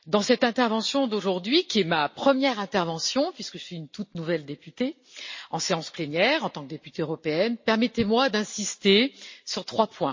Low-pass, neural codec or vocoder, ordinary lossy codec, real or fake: 7.2 kHz; none; MP3, 32 kbps; real